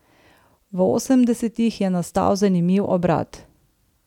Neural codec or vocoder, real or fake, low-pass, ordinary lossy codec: none; real; 19.8 kHz; none